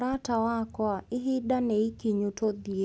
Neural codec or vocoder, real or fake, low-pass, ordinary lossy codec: none; real; none; none